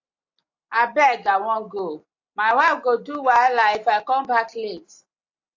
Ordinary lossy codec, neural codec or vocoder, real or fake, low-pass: AAC, 48 kbps; none; real; 7.2 kHz